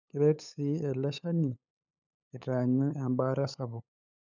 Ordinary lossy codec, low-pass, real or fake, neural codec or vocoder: none; 7.2 kHz; fake; codec, 16 kHz, 8 kbps, FunCodec, trained on LibriTTS, 25 frames a second